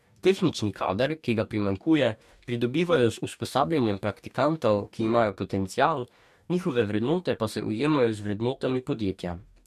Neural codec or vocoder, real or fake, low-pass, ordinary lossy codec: codec, 44.1 kHz, 2.6 kbps, DAC; fake; 14.4 kHz; MP3, 96 kbps